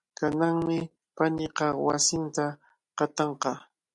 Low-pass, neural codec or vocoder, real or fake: 10.8 kHz; none; real